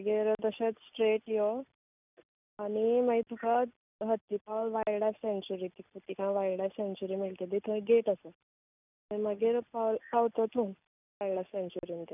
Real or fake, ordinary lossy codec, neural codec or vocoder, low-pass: real; none; none; 3.6 kHz